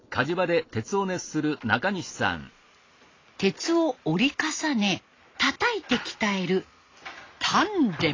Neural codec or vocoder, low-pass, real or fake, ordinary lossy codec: none; 7.2 kHz; real; AAC, 32 kbps